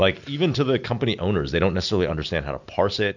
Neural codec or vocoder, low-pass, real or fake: none; 7.2 kHz; real